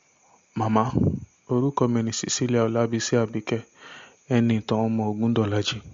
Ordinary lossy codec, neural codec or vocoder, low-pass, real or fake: MP3, 48 kbps; none; 7.2 kHz; real